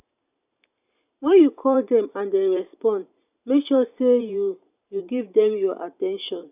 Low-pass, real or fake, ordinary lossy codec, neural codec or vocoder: 3.6 kHz; fake; none; vocoder, 44.1 kHz, 128 mel bands every 512 samples, BigVGAN v2